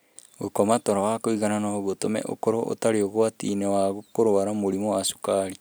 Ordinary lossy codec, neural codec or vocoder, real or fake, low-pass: none; none; real; none